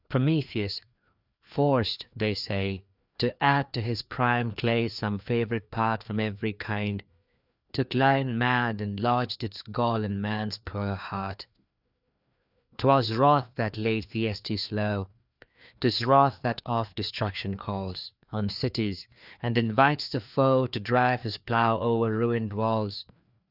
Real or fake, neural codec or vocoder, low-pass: fake; codec, 16 kHz, 2 kbps, FreqCodec, larger model; 5.4 kHz